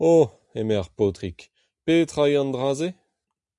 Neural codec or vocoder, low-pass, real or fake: none; 10.8 kHz; real